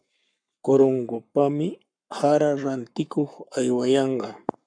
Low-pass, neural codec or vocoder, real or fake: 9.9 kHz; codec, 44.1 kHz, 7.8 kbps, Pupu-Codec; fake